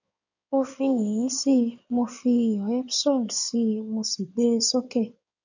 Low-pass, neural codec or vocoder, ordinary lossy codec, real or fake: 7.2 kHz; codec, 16 kHz in and 24 kHz out, 2.2 kbps, FireRedTTS-2 codec; none; fake